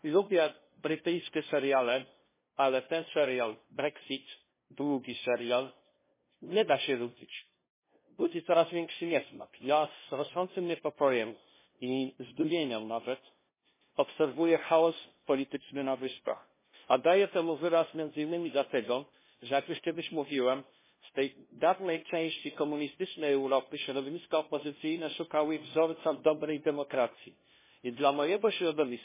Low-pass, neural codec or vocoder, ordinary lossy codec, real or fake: 3.6 kHz; codec, 16 kHz, 0.5 kbps, FunCodec, trained on LibriTTS, 25 frames a second; MP3, 16 kbps; fake